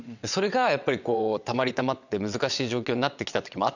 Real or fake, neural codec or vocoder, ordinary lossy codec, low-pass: fake; vocoder, 22.05 kHz, 80 mel bands, WaveNeXt; none; 7.2 kHz